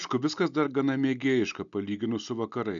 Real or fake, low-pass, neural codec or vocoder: real; 7.2 kHz; none